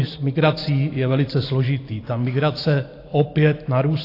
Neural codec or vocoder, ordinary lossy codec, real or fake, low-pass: vocoder, 44.1 kHz, 128 mel bands every 512 samples, BigVGAN v2; AAC, 32 kbps; fake; 5.4 kHz